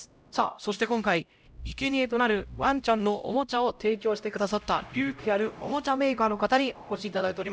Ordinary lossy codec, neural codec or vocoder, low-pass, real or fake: none; codec, 16 kHz, 0.5 kbps, X-Codec, HuBERT features, trained on LibriSpeech; none; fake